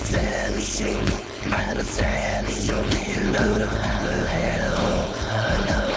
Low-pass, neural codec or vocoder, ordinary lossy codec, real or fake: none; codec, 16 kHz, 4.8 kbps, FACodec; none; fake